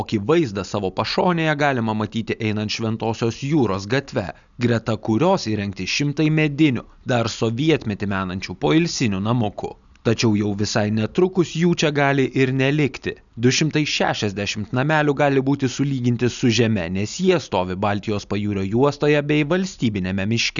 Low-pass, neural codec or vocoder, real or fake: 7.2 kHz; none; real